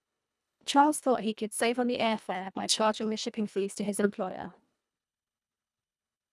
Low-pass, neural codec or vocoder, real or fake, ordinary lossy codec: none; codec, 24 kHz, 1.5 kbps, HILCodec; fake; none